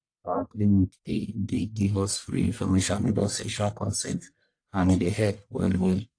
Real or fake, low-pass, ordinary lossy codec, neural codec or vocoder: fake; 9.9 kHz; AAC, 48 kbps; codec, 44.1 kHz, 1.7 kbps, Pupu-Codec